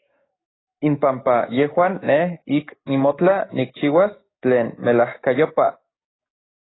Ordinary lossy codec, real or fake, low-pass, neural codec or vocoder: AAC, 16 kbps; fake; 7.2 kHz; codec, 44.1 kHz, 7.8 kbps, DAC